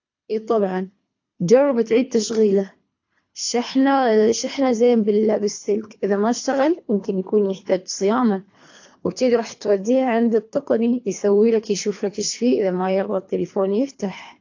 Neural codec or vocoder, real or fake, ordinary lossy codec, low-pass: codec, 24 kHz, 3 kbps, HILCodec; fake; AAC, 48 kbps; 7.2 kHz